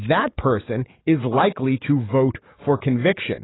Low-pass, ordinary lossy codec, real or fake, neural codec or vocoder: 7.2 kHz; AAC, 16 kbps; fake; codec, 44.1 kHz, 7.8 kbps, DAC